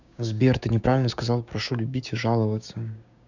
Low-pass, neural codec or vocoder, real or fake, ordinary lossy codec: 7.2 kHz; codec, 16 kHz, 6 kbps, DAC; fake; none